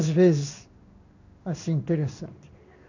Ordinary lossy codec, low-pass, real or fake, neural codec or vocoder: none; 7.2 kHz; fake; codec, 16 kHz in and 24 kHz out, 1 kbps, XY-Tokenizer